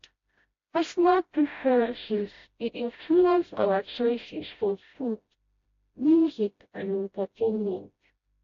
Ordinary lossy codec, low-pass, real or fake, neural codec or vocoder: AAC, 48 kbps; 7.2 kHz; fake; codec, 16 kHz, 0.5 kbps, FreqCodec, smaller model